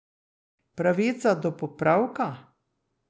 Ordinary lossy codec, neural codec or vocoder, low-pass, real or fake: none; none; none; real